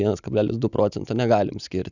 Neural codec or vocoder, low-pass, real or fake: none; 7.2 kHz; real